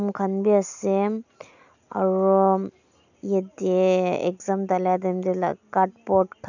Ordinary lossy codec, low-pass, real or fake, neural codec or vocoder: none; 7.2 kHz; real; none